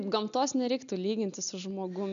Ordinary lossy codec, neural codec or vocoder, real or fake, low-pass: MP3, 96 kbps; none; real; 7.2 kHz